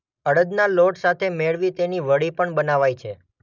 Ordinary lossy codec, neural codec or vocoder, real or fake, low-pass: none; none; real; 7.2 kHz